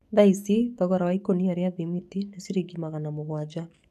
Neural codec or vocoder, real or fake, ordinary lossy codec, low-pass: codec, 44.1 kHz, 7.8 kbps, DAC; fake; none; 14.4 kHz